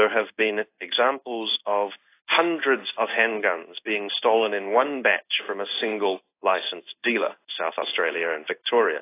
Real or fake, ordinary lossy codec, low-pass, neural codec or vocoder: fake; AAC, 24 kbps; 3.6 kHz; codec, 16 kHz in and 24 kHz out, 1 kbps, XY-Tokenizer